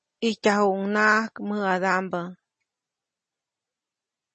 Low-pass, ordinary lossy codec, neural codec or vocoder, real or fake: 10.8 kHz; MP3, 32 kbps; none; real